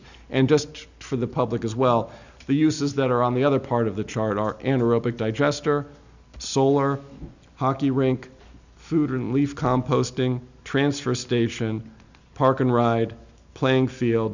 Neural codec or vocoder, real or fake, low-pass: none; real; 7.2 kHz